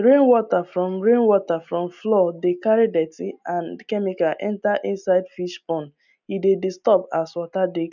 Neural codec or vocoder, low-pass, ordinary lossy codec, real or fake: none; 7.2 kHz; none; real